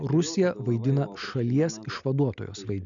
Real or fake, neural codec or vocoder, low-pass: real; none; 7.2 kHz